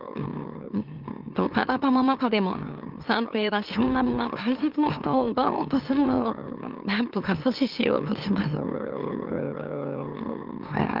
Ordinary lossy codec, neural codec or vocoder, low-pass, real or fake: Opus, 32 kbps; autoencoder, 44.1 kHz, a latent of 192 numbers a frame, MeloTTS; 5.4 kHz; fake